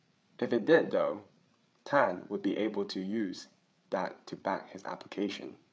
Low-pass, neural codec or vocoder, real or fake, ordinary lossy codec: none; codec, 16 kHz, 8 kbps, FreqCodec, larger model; fake; none